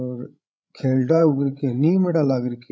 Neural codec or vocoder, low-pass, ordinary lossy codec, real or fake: codec, 16 kHz, 8 kbps, FreqCodec, larger model; none; none; fake